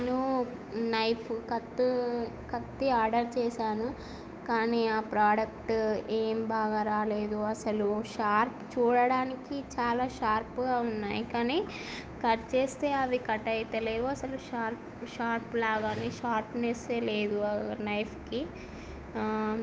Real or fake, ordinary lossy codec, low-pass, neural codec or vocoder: fake; none; none; codec, 16 kHz, 8 kbps, FunCodec, trained on Chinese and English, 25 frames a second